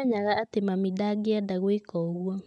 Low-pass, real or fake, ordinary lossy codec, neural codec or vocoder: 10.8 kHz; real; none; none